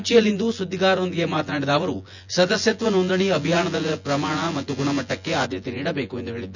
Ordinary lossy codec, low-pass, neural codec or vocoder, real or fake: none; 7.2 kHz; vocoder, 24 kHz, 100 mel bands, Vocos; fake